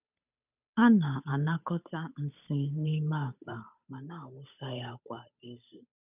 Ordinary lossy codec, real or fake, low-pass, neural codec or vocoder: none; fake; 3.6 kHz; codec, 16 kHz, 8 kbps, FunCodec, trained on Chinese and English, 25 frames a second